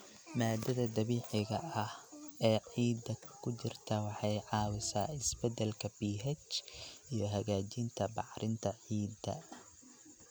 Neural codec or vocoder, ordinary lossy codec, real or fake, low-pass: none; none; real; none